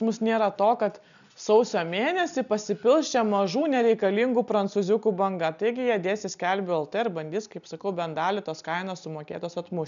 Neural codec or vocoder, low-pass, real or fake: none; 7.2 kHz; real